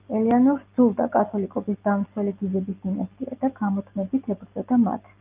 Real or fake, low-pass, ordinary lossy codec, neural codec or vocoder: real; 3.6 kHz; Opus, 24 kbps; none